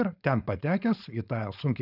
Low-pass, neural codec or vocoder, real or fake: 5.4 kHz; codec, 16 kHz, 4.8 kbps, FACodec; fake